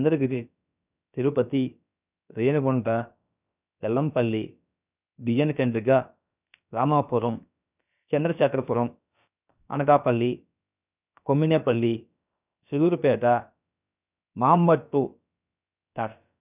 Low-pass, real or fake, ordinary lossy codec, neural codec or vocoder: 3.6 kHz; fake; none; codec, 16 kHz, about 1 kbps, DyCAST, with the encoder's durations